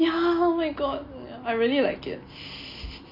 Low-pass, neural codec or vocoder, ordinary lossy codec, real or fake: 5.4 kHz; none; AAC, 32 kbps; real